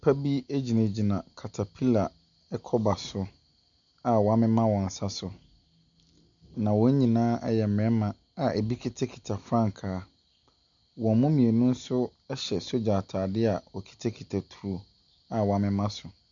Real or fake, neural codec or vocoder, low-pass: real; none; 7.2 kHz